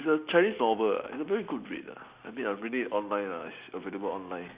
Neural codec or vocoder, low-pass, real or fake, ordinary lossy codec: none; 3.6 kHz; real; Opus, 64 kbps